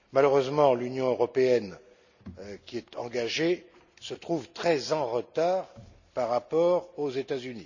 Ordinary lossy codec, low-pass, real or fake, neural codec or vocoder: none; 7.2 kHz; real; none